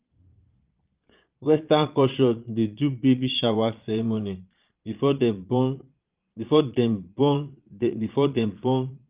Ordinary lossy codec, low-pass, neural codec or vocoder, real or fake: Opus, 32 kbps; 3.6 kHz; vocoder, 22.05 kHz, 80 mel bands, Vocos; fake